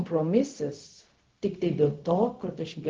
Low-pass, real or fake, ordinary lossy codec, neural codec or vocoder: 7.2 kHz; fake; Opus, 16 kbps; codec, 16 kHz, 0.4 kbps, LongCat-Audio-Codec